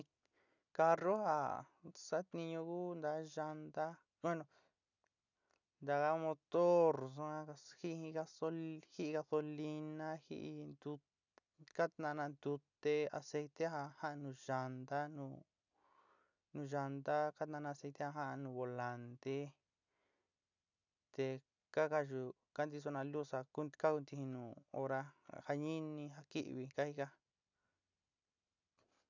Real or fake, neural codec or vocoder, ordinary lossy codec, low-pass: real; none; none; 7.2 kHz